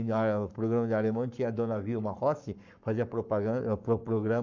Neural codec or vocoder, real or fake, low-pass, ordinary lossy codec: codec, 44.1 kHz, 7.8 kbps, Pupu-Codec; fake; 7.2 kHz; none